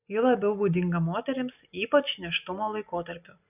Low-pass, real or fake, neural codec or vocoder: 3.6 kHz; real; none